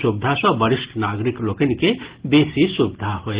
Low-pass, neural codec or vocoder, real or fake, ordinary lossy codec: 3.6 kHz; none; real; Opus, 16 kbps